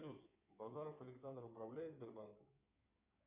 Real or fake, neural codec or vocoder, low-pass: fake; codec, 16 kHz in and 24 kHz out, 2.2 kbps, FireRedTTS-2 codec; 3.6 kHz